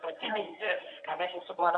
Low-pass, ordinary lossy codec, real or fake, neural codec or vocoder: 10.8 kHz; MP3, 96 kbps; fake; codec, 24 kHz, 0.9 kbps, WavTokenizer, medium speech release version 1